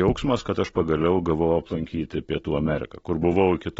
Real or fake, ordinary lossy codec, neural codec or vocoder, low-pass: real; AAC, 24 kbps; none; 10.8 kHz